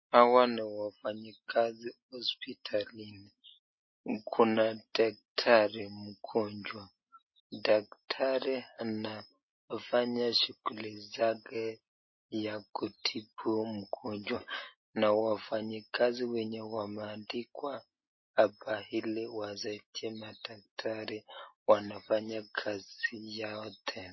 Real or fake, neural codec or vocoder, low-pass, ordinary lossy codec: real; none; 7.2 kHz; MP3, 24 kbps